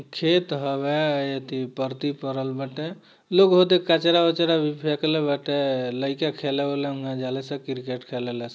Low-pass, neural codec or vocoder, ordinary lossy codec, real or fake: none; none; none; real